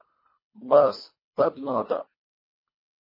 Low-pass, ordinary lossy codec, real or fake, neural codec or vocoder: 5.4 kHz; MP3, 24 kbps; fake; codec, 24 kHz, 1.5 kbps, HILCodec